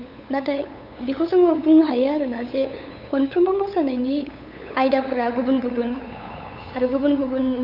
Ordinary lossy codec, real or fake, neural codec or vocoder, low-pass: none; fake; codec, 16 kHz, 8 kbps, FunCodec, trained on LibriTTS, 25 frames a second; 5.4 kHz